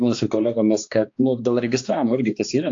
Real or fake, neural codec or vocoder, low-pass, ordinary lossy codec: fake; codec, 16 kHz, 2 kbps, X-Codec, HuBERT features, trained on balanced general audio; 7.2 kHz; AAC, 48 kbps